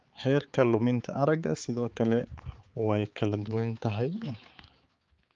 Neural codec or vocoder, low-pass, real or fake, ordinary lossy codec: codec, 16 kHz, 4 kbps, X-Codec, HuBERT features, trained on balanced general audio; 7.2 kHz; fake; Opus, 32 kbps